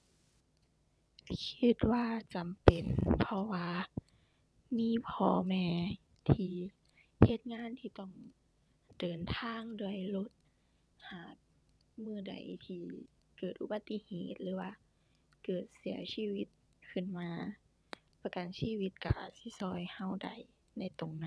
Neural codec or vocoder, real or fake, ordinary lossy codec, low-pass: vocoder, 22.05 kHz, 80 mel bands, WaveNeXt; fake; none; none